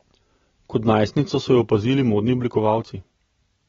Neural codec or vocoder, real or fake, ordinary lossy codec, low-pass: none; real; AAC, 24 kbps; 7.2 kHz